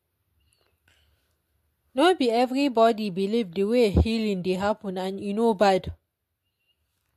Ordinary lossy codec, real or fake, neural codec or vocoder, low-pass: MP3, 64 kbps; real; none; 14.4 kHz